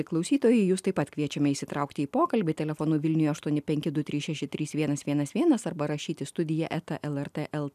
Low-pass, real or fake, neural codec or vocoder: 14.4 kHz; real; none